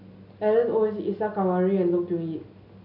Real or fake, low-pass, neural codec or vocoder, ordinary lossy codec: real; 5.4 kHz; none; AAC, 48 kbps